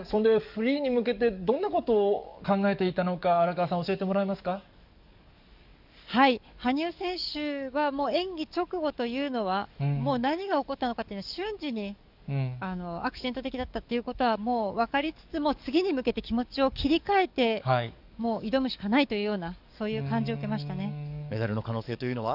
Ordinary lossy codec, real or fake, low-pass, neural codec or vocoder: none; fake; 5.4 kHz; codec, 44.1 kHz, 7.8 kbps, DAC